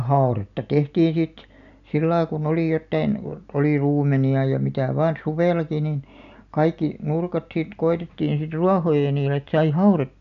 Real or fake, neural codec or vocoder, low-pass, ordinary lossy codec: real; none; 7.2 kHz; none